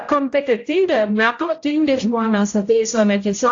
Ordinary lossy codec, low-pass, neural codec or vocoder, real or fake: AAC, 48 kbps; 7.2 kHz; codec, 16 kHz, 0.5 kbps, X-Codec, HuBERT features, trained on general audio; fake